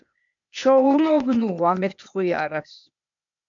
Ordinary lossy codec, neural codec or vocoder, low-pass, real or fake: MP3, 64 kbps; codec, 16 kHz, 0.8 kbps, ZipCodec; 7.2 kHz; fake